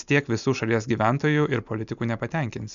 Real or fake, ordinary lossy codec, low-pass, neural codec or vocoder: real; MP3, 96 kbps; 7.2 kHz; none